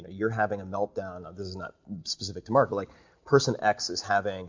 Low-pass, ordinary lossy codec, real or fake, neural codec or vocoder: 7.2 kHz; MP3, 48 kbps; real; none